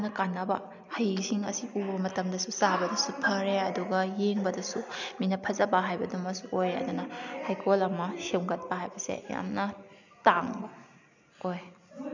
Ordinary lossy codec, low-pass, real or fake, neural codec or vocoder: none; 7.2 kHz; real; none